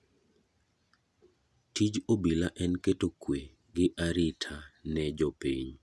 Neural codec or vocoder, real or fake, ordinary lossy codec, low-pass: none; real; none; none